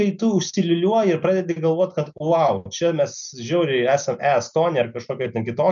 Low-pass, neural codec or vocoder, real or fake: 7.2 kHz; none; real